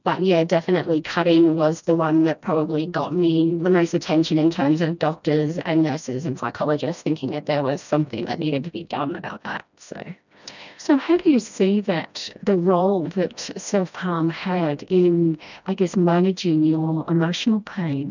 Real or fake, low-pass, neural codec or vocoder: fake; 7.2 kHz; codec, 16 kHz, 1 kbps, FreqCodec, smaller model